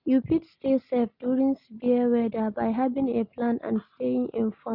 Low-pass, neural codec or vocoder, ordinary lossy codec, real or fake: 5.4 kHz; none; none; real